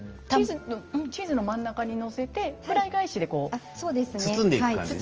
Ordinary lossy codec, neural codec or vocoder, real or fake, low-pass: Opus, 24 kbps; none; real; 7.2 kHz